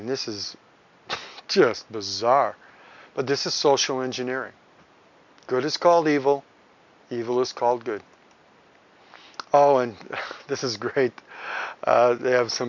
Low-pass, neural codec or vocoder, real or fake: 7.2 kHz; none; real